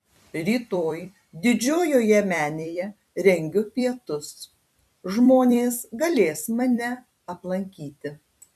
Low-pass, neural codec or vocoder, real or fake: 14.4 kHz; vocoder, 44.1 kHz, 128 mel bands every 256 samples, BigVGAN v2; fake